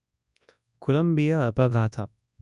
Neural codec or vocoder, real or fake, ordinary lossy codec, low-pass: codec, 24 kHz, 0.9 kbps, WavTokenizer, large speech release; fake; none; 10.8 kHz